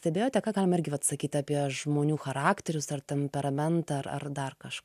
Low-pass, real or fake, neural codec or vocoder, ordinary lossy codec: 14.4 kHz; real; none; AAC, 96 kbps